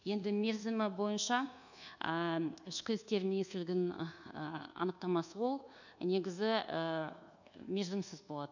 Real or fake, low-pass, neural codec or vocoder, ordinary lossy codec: fake; 7.2 kHz; codec, 24 kHz, 1.2 kbps, DualCodec; none